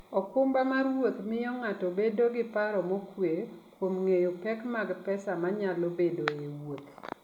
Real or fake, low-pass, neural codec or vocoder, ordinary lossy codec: real; 19.8 kHz; none; none